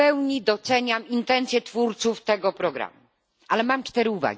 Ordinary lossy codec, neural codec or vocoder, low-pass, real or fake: none; none; none; real